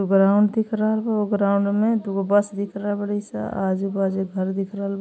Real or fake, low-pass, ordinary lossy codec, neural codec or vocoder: real; none; none; none